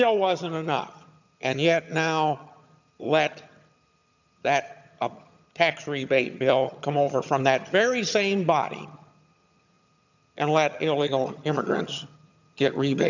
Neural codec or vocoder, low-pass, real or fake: vocoder, 22.05 kHz, 80 mel bands, HiFi-GAN; 7.2 kHz; fake